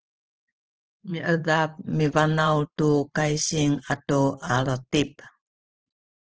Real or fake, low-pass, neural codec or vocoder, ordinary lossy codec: fake; 7.2 kHz; vocoder, 44.1 kHz, 128 mel bands every 512 samples, BigVGAN v2; Opus, 16 kbps